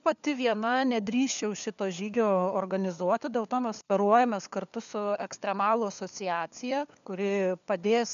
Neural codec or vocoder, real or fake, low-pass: codec, 16 kHz, 4 kbps, FunCodec, trained on LibriTTS, 50 frames a second; fake; 7.2 kHz